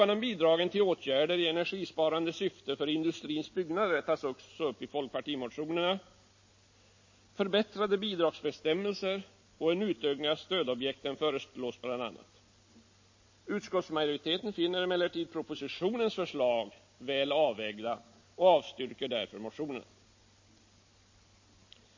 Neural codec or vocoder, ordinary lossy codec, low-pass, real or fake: none; MP3, 32 kbps; 7.2 kHz; real